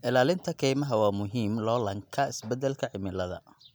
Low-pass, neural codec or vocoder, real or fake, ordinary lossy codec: none; none; real; none